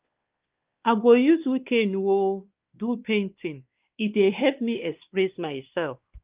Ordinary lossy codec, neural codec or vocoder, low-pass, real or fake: Opus, 24 kbps; codec, 16 kHz, 2 kbps, X-Codec, WavLM features, trained on Multilingual LibriSpeech; 3.6 kHz; fake